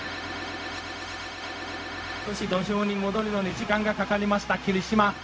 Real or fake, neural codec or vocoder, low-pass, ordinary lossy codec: fake; codec, 16 kHz, 0.4 kbps, LongCat-Audio-Codec; none; none